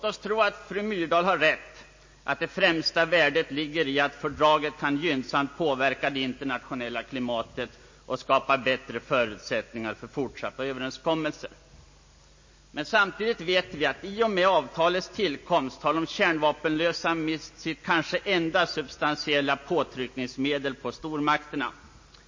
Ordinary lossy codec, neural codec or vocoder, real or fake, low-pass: MP3, 32 kbps; none; real; 7.2 kHz